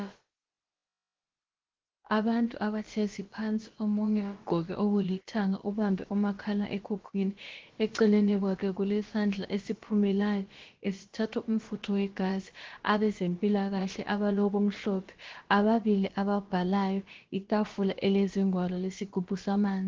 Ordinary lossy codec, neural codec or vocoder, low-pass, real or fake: Opus, 16 kbps; codec, 16 kHz, about 1 kbps, DyCAST, with the encoder's durations; 7.2 kHz; fake